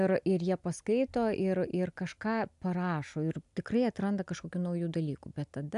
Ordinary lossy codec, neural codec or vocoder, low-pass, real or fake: AAC, 96 kbps; none; 10.8 kHz; real